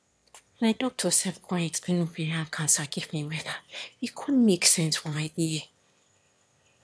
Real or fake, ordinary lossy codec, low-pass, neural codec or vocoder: fake; none; none; autoencoder, 22.05 kHz, a latent of 192 numbers a frame, VITS, trained on one speaker